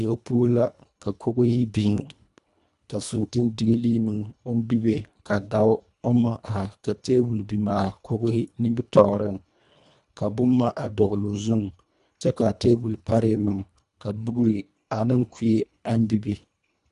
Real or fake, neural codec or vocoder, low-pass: fake; codec, 24 kHz, 1.5 kbps, HILCodec; 10.8 kHz